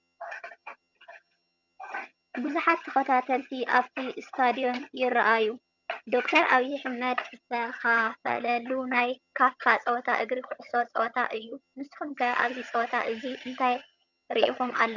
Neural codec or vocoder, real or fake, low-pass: vocoder, 22.05 kHz, 80 mel bands, HiFi-GAN; fake; 7.2 kHz